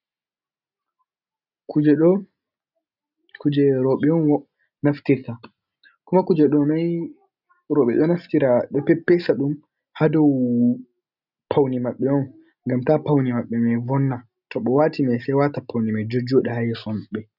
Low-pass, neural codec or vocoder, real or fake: 5.4 kHz; none; real